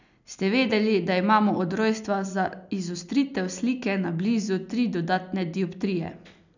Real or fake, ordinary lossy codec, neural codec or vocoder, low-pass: real; none; none; 7.2 kHz